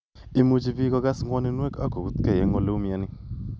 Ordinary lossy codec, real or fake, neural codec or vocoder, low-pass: none; real; none; none